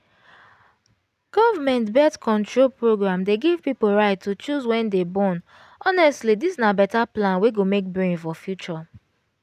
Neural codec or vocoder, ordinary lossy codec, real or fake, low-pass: none; none; real; 14.4 kHz